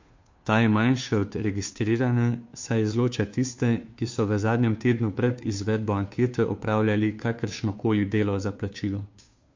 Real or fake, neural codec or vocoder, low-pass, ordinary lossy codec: fake; codec, 16 kHz, 2 kbps, FunCodec, trained on Chinese and English, 25 frames a second; 7.2 kHz; MP3, 48 kbps